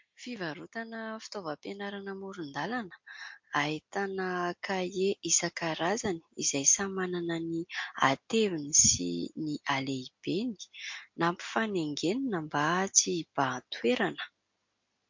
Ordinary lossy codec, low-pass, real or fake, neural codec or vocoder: MP3, 48 kbps; 7.2 kHz; real; none